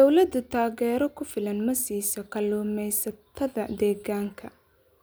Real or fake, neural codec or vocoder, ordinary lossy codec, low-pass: real; none; none; none